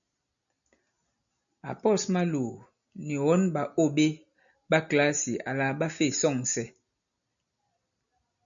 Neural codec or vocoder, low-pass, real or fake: none; 7.2 kHz; real